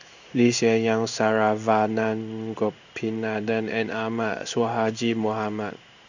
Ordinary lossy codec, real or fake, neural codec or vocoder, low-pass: none; fake; codec, 16 kHz in and 24 kHz out, 1 kbps, XY-Tokenizer; 7.2 kHz